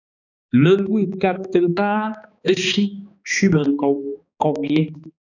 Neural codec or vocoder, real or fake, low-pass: codec, 16 kHz, 2 kbps, X-Codec, HuBERT features, trained on balanced general audio; fake; 7.2 kHz